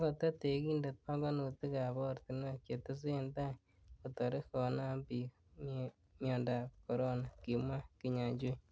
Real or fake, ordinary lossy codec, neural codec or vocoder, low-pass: real; none; none; none